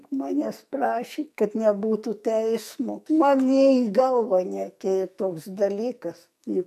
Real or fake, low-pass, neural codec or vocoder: fake; 14.4 kHz; codec, 44.1 kHz, 2.6 kbps, SNAC